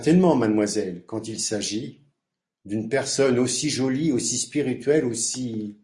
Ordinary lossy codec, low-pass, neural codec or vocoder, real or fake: MP3, 48 kbps; 10.8 kHz; none; real